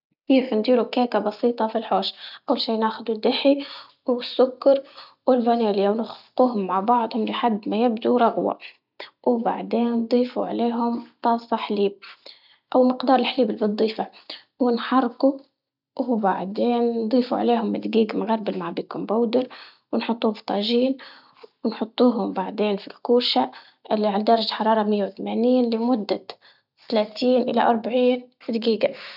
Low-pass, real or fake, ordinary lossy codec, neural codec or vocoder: 5.4 kHz; real; none; none